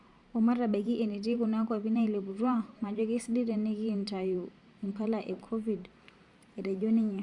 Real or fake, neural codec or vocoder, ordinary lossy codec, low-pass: fake; vocoder, 44.1 kHz, 128 mel bands every 512 samples, BigVGAN v2; Opus, 64 kbps; 10.8 kHz